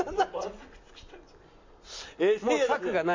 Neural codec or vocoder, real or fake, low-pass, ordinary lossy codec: none; real; 7.2 kHz; MP3, 48 kbps